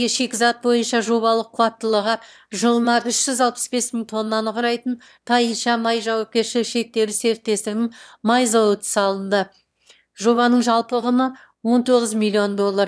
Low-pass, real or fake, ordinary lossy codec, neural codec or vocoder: none; fake; none; autoencoder, 22.05 kHz, a latent of 192 numbers a frame, VITS, trained on one speaker